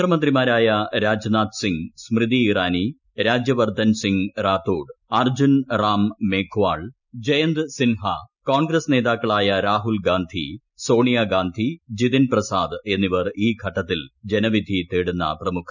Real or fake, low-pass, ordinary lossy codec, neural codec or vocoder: real; 7.2 kHz; none; none